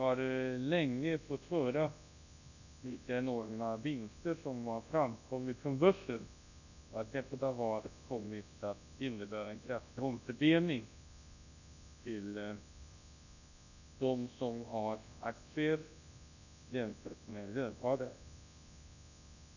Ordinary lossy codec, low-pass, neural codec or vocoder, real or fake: none; 7.2 kHz; codec, 24 kHz, 0.9 kbps, WavTokenizer, large speech release; fake